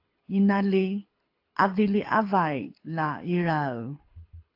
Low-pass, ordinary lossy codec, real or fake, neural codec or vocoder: 5.4 kHz; AAC, 32 kbps; fake; codec, 24 kHz, 6 kbps, HILCodec